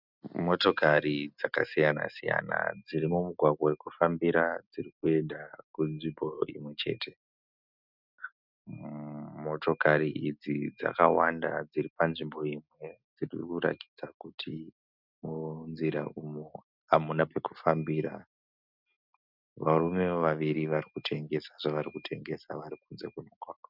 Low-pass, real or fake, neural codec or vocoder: 5.4 kHz; real; none